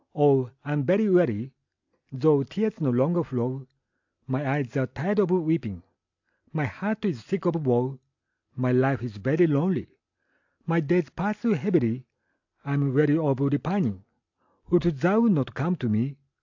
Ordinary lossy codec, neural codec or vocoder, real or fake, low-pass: AAC, 48 kbps; none; real; 7.2 kHz